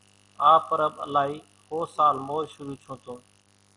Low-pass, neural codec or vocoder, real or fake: 10.8 kHz; none; real